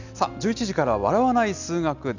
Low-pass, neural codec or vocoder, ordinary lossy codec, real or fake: 7.2 kHz; none; none; real